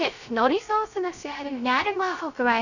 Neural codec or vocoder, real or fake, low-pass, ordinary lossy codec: codec, 16 kHz, 0.3 kbps, FocalCodec; fake; 7.2 kHz; none